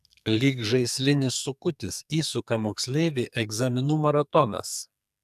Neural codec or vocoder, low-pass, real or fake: codec, 44.1 kHz, 2.6 kbps, SNAC; 14.4 kHz; fake